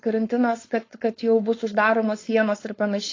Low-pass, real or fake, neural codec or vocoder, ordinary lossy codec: 7.2 kHz; fake; codec, 16 kHz, 4.8 kbps, FACodec; AAC, 32 kbps